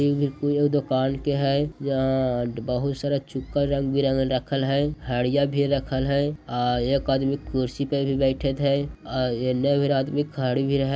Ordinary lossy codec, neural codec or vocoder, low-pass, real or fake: none; none; none; real